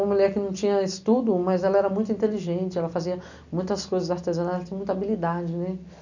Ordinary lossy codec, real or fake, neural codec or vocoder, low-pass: none; real; none; 7.2 kHz